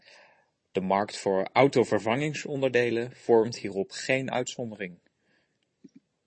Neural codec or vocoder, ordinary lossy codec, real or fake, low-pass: none; MP3, 32 kbps; real; 9.9 kHz